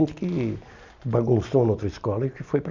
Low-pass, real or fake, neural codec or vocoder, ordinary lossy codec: 7.2 kHz; real; none; none